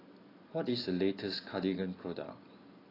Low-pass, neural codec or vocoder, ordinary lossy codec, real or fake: 5.4 kHz; none; AAC, 24 kbps; real